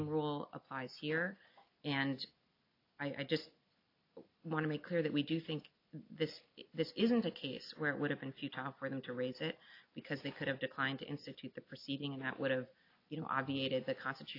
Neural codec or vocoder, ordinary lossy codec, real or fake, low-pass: none; AAC, 32 kbps; real; 5.4 kHz